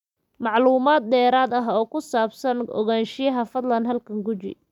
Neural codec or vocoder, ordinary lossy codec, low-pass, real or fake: none; none; 19.8 kHz; real